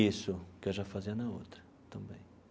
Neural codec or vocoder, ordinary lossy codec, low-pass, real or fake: none; none; none; real